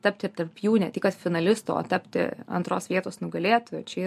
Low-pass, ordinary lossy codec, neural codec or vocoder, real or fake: 14.4 kHz; MP3, 64 kbps; none; real